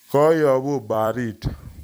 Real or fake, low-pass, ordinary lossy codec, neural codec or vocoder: fake; none; none; vocoder, 44.1 kHz, 128 mel bands every 256 samples, BigVGAN v2